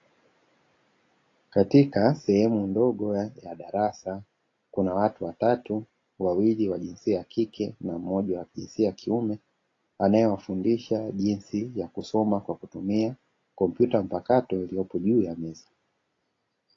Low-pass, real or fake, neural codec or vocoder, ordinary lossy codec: 7.2 kHz; real; none; AAC, 32 kbps